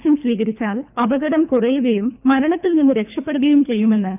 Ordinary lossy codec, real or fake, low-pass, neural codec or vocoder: none; fake; 3.6 kHz; codec, 24 kHz, 3 kbps, HILCodec